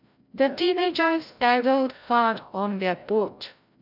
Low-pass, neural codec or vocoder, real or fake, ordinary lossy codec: 5.4 kHz; codec, 16 kHz, 0.5 kbps, FreqCodec, larger model; fake; none